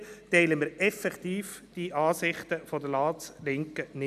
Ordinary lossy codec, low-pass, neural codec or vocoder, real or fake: none; 14.4 kHz; none; real